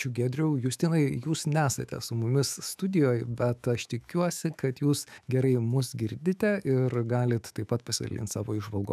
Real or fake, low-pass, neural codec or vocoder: fake; 14.4 kHz; autoencoder, 48 kHz, 128 numbers a frame, DAC-VAE, trained on Japanese speech